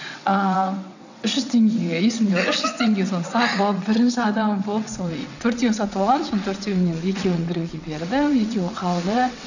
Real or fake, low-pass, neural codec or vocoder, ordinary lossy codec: fake; 7.2 kHz; vocoder, 22.05 kHz, 80 mel bands, WaveNeXt; none